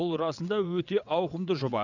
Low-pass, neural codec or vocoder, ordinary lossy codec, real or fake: 7.2 kHz; vocoder, 22.05 kHz, 80 mel bands, WaveNeXt; none; fake